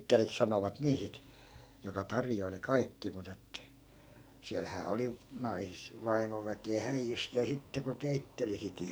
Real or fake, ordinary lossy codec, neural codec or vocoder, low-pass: fake; none; codec, 44.1 kHz, 2.6 kbps, SNAC; none